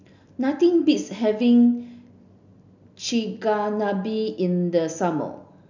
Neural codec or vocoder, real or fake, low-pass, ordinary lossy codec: none; real; 7.2 kHz; none